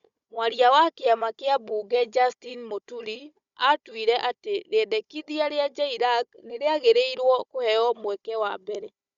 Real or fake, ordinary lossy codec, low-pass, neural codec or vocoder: fake; Opus, 64 kbps; 7.2 kHz; codec, 16 kHz, 16 kbps, FunCodec, trained on Chinese and English, 50 frames a second